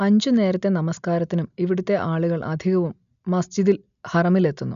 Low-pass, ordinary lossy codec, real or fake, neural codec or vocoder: 7.2 kHz; none; real; none